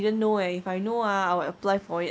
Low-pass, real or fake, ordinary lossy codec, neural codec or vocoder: none; real; none; none